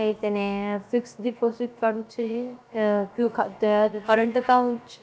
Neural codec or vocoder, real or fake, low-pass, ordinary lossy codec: codec, 16 kHz, about 1 kbps, DyCAST, with the encoder's durations; fake; none; none